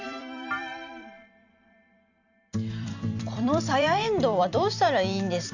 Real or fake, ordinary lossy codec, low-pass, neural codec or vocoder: fake; none; 7.2 kHz; vocoder, 44.1 kHz, 128 mel bands every 256 samples, BigVGAN v2